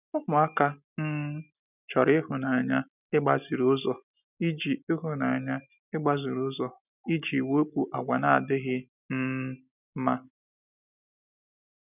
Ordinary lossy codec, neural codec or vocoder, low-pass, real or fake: none; none; 3.6 kHz; real